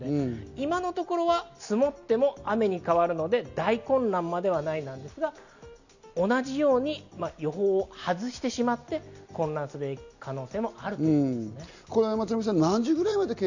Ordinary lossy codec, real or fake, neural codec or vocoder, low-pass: none; real; none; 7.2 kHz